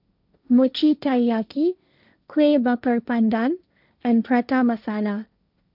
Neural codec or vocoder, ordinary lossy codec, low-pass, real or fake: codec, 16 kHz, 1.1 kbps, Voila-Tokenizer; none; 5.4 kHz; fake